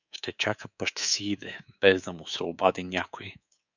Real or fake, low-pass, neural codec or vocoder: fake; 7.2 kHz; codec, 24 kHz, 3.1 kbps, DualCodec